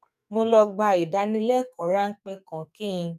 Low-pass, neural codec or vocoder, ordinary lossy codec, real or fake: 14.4 kHz; codec, 44.1 kHz, 2.6 kbps, SNAC; none; fake